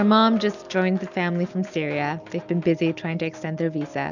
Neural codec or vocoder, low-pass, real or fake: none; 7.2 kHz; real